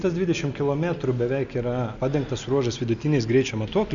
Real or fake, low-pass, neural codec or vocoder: real; 7.2 kHz; none